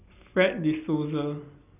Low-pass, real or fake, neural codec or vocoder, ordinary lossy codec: 3.6 kHz; real; none; none